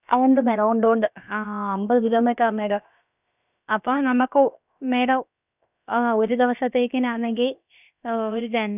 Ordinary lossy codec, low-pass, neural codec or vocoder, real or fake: none; 3.6 kHz; codec, 16 kHz, about 1 kbps, DyCAST, with the encoder's durations; fake